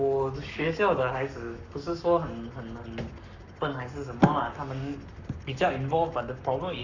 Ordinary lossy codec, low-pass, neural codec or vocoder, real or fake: none; 7.2 kHz; codec, 44.1 kHz, 7.8 kbps, Pupu-Codec; fake